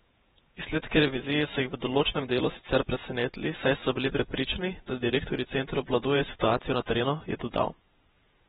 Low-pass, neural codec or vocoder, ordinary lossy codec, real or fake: 19.8 kHz; vocoder, 48 kHz, 128 mel bands, Vocos; AAC, 16 kbps; fake